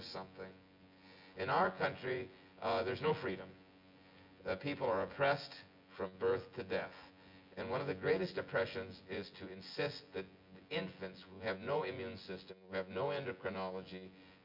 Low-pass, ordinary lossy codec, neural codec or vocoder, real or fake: 5.4 kHz; MP3, 48 kbps; vocoder, 24 kHz, 100 mel bands, Vocos; fake